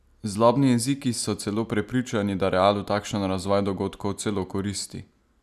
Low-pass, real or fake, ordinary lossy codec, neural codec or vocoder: 14.4 kHz; real; none; none